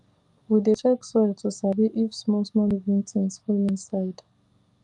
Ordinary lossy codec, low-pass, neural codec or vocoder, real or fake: Opus, 32 kbps; 10.8 kHz; codec, 44.1 kHz, 7.8 kbps, DAC; fake